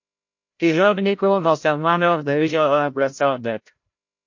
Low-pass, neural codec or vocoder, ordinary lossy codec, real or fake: 7.2 kHz; codec, 16 kHz, 0.5 kbps, FreqCodec, larger model; MP3, 48 kbps; fake